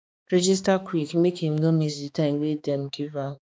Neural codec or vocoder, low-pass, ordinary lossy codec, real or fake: codec, 16 kHz, 2 kbps, X-Codec, HuBERT features, trained on balanced general audio; none; none; fake